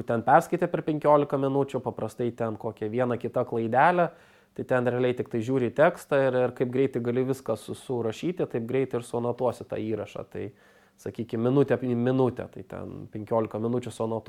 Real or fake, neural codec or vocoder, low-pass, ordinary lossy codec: real; none; 19.8 kHz; MP3, 96 kbps